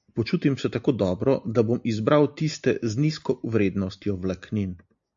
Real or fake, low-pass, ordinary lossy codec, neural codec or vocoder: real; 7.2 kHz; AAC, 64 kbps; none